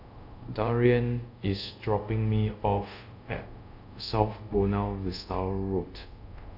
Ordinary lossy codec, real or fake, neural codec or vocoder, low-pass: none; fake; codec, 24 kHz, 0.5 kbps, DualCodec; 5.4 kHz